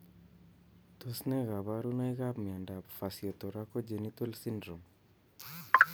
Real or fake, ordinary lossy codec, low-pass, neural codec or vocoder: real; none; none; none